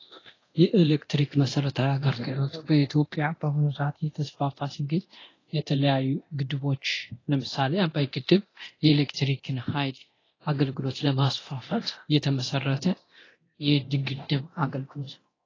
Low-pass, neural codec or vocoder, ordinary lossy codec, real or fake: 7.2 kHz; codec, 24 kHz, 0.9 kbps, DualCodec; AAC, 32 kbps; fake